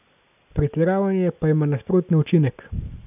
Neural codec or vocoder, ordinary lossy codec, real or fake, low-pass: none; none; real; 3.6 kHz